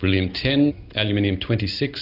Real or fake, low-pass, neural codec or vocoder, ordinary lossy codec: real; 5.4 kHz; none; AAC, 48 kbps